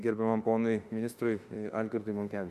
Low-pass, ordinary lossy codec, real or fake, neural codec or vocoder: 14.4 kHz; Opus, 64 kbps; fake; autoencoder, 48 kHz, 32 numbers a frame, DAC-VAE, trained on Japanese speech